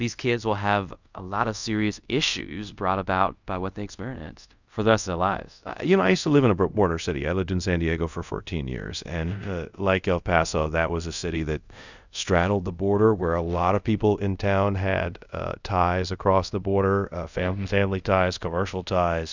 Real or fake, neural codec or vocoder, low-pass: fake; codec, 24 kHz, 0.5 kbps, DualCodec; 7.2 kHz